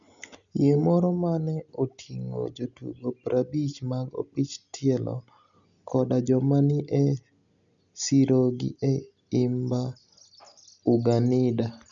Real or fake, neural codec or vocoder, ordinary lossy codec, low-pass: real; none; none; 7.2 kHz